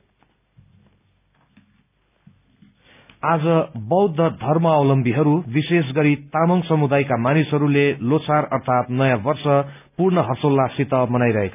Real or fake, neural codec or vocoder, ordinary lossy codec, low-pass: real; none; none; 3.6 kHz